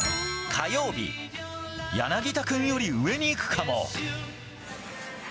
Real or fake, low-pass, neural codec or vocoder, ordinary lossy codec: real; none; none; none